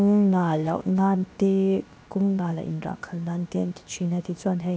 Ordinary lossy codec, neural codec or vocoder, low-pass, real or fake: none; codec, 16 kHz, 0.7 kbps, FocalCodec; none; fake